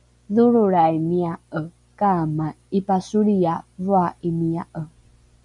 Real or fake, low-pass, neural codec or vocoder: real; 10.8 kHz; none